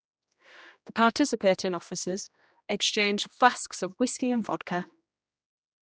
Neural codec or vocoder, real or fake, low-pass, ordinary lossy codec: codec, 16 kHz, 1 kbps, X-Codec, HuBERT features, trained on general audio; fake; none; none